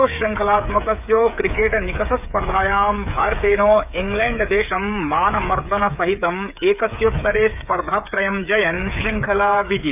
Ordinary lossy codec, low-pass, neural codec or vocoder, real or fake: none; 3.6 kHz; codec, 16 kHz, 8 kbps, FreqCodec, smaller model; fake